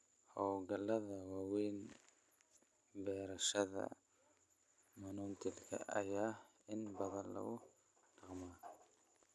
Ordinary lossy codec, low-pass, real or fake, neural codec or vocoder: none; none; real; none